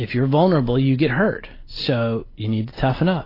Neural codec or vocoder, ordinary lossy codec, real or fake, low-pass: none; AAC, 24 kbps; real; 5.4 kHz